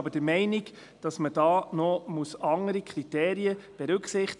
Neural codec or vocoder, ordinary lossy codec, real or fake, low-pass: none; none; real; 10.8 kHz